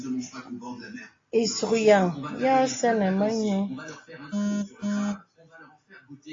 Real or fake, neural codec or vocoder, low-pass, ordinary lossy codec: real; none; 7.2 kHz; AAC, 32 kbps